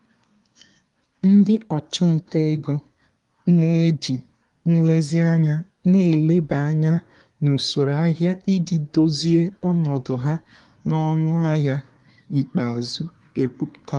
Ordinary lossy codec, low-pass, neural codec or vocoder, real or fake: Opus, 32 kbps; 10.8 kHz; codec, 24 kHz, 1 kbps, SNAC; fake